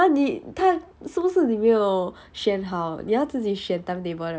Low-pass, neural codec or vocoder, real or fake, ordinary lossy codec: none; none; real; none